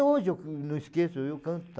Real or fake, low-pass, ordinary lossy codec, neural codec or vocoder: real; none; none; none